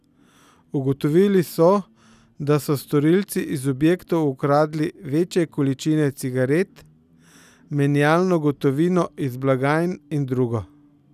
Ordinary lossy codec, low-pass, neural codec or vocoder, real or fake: none; 14.4 kHz; none; real